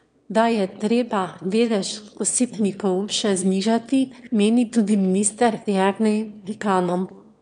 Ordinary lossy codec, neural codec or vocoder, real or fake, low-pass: none; autoencoder, 22.05 kHz, a latent of 192 numbers a frame, VITS, trained on one speaker; fake; 9.9 kHz